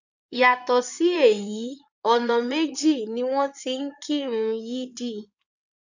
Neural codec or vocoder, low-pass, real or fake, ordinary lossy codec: codec, 16 kHz in and 24 kHz out, 2.2 kbps, FireRedTTS-2 codec; 7.2 kHz; fake; none